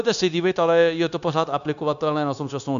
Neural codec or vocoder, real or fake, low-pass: codec, 16 kHz, 0.9 kbps, LongCat-Audio-Codec; fake; 7.2 kHz